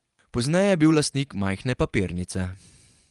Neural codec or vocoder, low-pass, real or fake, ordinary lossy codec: vocoder, 24 kHz, 100 mel bands, Vocos; 10.8 kHz; fake; Opus, 32 kbps